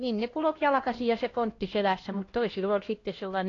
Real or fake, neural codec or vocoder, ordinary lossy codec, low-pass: fake; codec, 16 kHz, 1 kbps, X-Codec, HuBERT features, trained on LibriSpeech; AAC, 32 kbps; 7.2 kHz